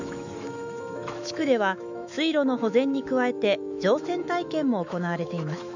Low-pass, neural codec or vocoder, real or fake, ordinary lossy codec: 7.2 kHz; autoencoder, 48 kHz, 128 numbers a frame, DAC-VAE, trained on Japanese speech; fake; none